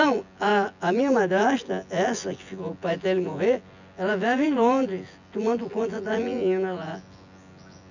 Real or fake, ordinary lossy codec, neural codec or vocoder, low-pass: fake; none; vocoder, 24 kHz, 100 mel bands, Vocos; 7.2 kHz